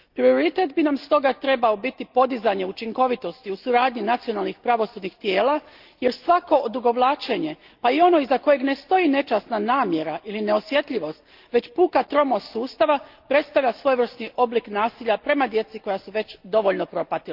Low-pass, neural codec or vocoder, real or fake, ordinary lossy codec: 5.4 kHz; none; real; Opus, 32 kbps